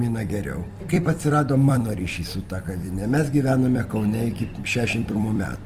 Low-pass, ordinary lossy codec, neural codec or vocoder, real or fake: 14.4 kHz; Opus, 24 kbps; vocoder, 44.1 kHz, 128 mel bands every 256 samples, BigVGAN v2; fake